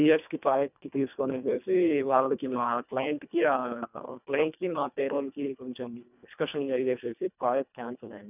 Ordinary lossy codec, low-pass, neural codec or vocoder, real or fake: none; 3.6 kHz; codec, 24 kHz, 1.5 kbps, HILCodec; fake